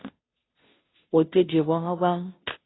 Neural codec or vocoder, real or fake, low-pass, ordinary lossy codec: codec, 16 kHz, 0.5 kbps, FunCodec, trained on Chinese and English, 25 frames a second; fake; 7.2 kHz; AAC, 16 kbps